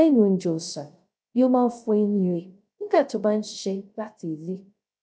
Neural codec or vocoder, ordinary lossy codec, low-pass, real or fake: codec, 16 kHz, 0.3 kbps, FocalCodec; none; none; fake